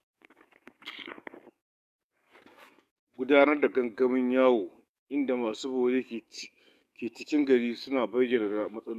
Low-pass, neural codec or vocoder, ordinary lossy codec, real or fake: 14.4 kHz; codec, 44.1 kHz, 7.8 kbps, DAC; none; fake